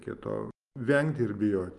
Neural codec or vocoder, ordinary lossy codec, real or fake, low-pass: none; Opus, 32 kbps; real; 10.8 kHz